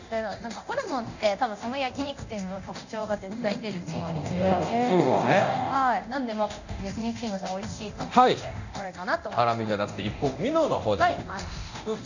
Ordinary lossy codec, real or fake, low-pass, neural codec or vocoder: none; fake; 7.2 kHz; codec, 24 kHz, 0.9 kbps, DualCodec